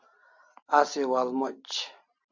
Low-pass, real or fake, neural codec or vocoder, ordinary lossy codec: 7.2 kHz; real; none; MP3, 64 kbps